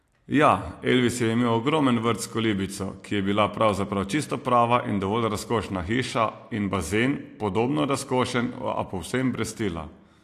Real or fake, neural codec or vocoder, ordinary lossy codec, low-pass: real; none; AAC, 64 kbps; 14.4 kHz